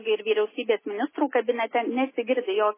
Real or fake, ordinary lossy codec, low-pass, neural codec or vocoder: real; MP3, 16 kbps; 3.6 kHz; none